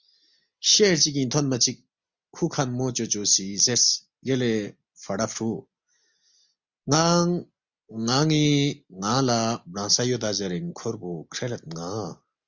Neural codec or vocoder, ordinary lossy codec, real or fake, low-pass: none; Opus, 64 kbps; real; 7.2 kHz